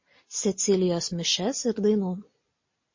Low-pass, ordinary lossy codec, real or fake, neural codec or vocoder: 7.2 kHz; MP3, 32 kbps; real; none